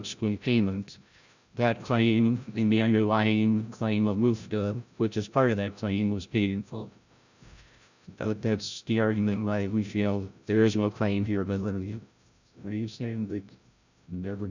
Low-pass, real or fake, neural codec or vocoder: 7.2 kHz; fake; codec, 16 kHz, 0.5 kbps, FreqCodec, larger model